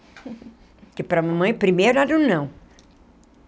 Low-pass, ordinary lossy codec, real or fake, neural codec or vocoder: none; none; real; none